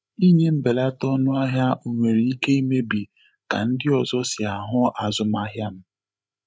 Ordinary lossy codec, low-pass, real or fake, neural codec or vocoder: none; none; fake; codec, 16 kHz, 8 kbps, FreqCodec, larger model